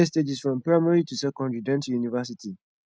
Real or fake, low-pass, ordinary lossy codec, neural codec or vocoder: real; none; none; none